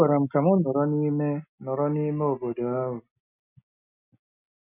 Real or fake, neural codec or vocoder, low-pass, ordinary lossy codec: real; none; 3.6 kHz; none